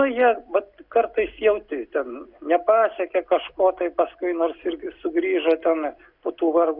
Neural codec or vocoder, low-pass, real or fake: none; 5.4 kHz; real